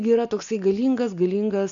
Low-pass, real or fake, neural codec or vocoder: 7.2 kHz; real; none